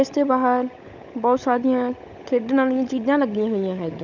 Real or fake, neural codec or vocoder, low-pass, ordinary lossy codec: fake; codec, 16 kHz, 16 kbps, FunCodec, trained on LibriTTS, 50 frames a second; 7.2 kHz; none